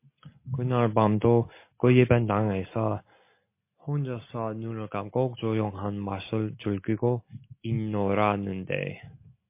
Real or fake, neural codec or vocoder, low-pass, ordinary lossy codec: real; none; 3.6 kHz; MP3, 24 kbps